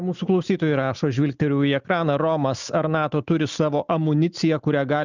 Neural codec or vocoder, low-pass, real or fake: none; 7.2 kHz; real